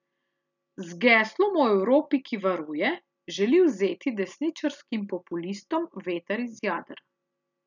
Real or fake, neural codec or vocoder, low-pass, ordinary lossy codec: real; none; 7.2 kHz; none